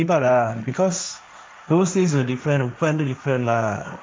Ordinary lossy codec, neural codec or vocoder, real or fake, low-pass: none; codec, 16 kHz, 1.1 kbps, Voila-Tokenizer; fake; none